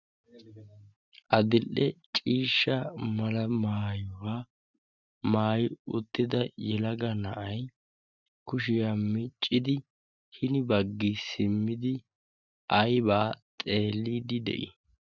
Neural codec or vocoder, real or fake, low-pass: none; real; 7.2 kHz